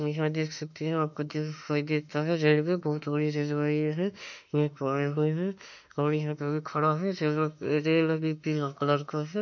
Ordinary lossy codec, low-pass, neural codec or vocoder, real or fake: none; 7.2 kHz; autoencoder, 48 kHz, 32 numbers a frame, DAC-VAE, trained on Japanese speech; fake